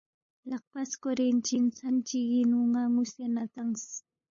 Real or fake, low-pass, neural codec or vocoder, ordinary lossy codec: fake; 7.2 kHz; codec, 16 kHz, 8 kbps, FunCodec, trained on LibriTTS, 25 frames a second; MP3, 32 kbps